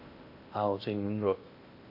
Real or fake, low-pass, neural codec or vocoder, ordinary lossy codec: fake; 5.4 kHz; codec, 16 kHz in and 24 kHz out, 0.8 kbps, FocalCodec, streaming, 65536 codes; AAC, 32 kbps